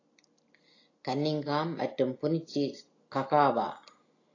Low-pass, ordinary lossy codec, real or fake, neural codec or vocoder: 7.2 kHz; AAC, 32 kbps; real; none